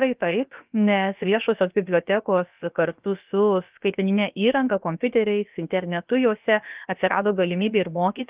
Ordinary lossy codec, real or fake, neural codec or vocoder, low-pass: Opus, 32 kbps; fake; codec, 16 kHz, about 1 kbps, DyCAST, with the encoder's durations; 3.6 kHz